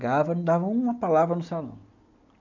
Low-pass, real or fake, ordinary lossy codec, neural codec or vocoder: 7.2 kHz; real; none; none